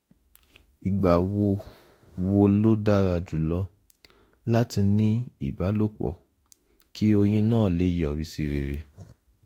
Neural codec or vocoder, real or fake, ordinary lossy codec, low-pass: autoencoder, 48 kHz, 32 numbers a frame, DAC-VAE, trained on Japanese speech; fake; AAC, 48 kbps; 19.8 kHz